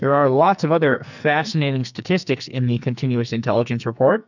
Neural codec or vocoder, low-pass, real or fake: codec, 44.1 kHz, 2.6 kbps, SNAC; 7.2 kHz; fake